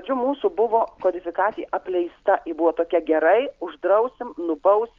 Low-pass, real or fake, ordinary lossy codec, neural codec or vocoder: 7.2 kHz; real; Opus, 32 kbps; none